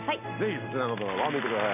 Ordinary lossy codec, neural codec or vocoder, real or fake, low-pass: none; none; real; 3.6 kHz